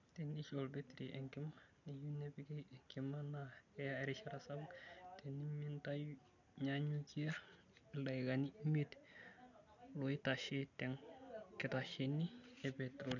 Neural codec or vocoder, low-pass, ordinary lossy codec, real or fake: none; 7.2 kHz; none; real